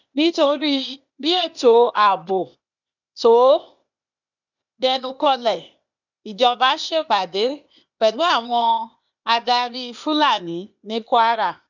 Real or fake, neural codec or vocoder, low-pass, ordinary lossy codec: fake; codec, 16 kHz, 0.8 kbps, ZipCodec; 7.2 kHz; none